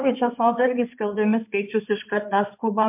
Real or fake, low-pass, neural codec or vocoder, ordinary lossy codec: fake; 3.6 kHz; codec, 16 kHz in and 24 kHz out, 2.2 kbps, FireRedTTS-2 codec; MP3, 32 kbps